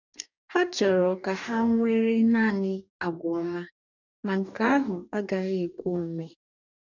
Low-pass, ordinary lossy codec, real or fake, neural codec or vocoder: 7.2 kHz; none; fake; codec, 44.1 kHz, 2.6 kbps, DAC